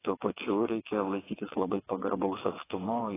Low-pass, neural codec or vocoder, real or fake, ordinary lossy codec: 3.6 kHz; vocoder, 22.05 kHz, 80 mel bands, WaveNeXt; fake; AAC, 16 kbps